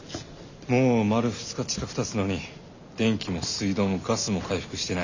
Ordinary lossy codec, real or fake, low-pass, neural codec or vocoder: none; real; 7.2 kHz; none